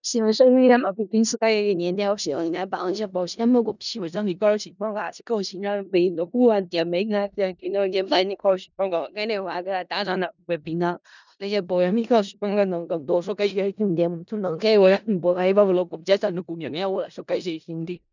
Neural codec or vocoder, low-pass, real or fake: codec, 16 kHz in and 24 kHz out, 0.4 kbps, LongCat-Audio-Codec, four codebook decoder; 7.2 kHz; fake